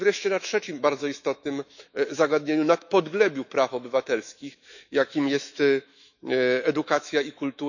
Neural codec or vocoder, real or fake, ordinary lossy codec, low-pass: autoencoder, 48 kHz, 128 numbers a frame, DAC-VAE, trained on Japanese speech; fake; none; 7.2 kHz